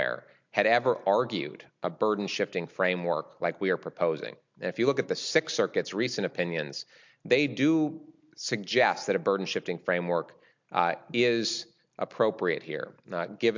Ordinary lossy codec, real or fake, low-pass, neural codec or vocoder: MP3, 64 kbps; real; 7.2 kHz; none